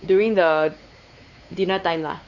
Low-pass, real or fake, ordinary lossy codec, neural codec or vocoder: 7.2 kHz; fake; none; codec, 16 kHz, 4 kbps, X-Codec, WavLM features, trained on Multilingual LibriSpeech